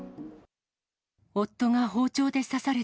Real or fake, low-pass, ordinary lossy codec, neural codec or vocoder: real; none; none; none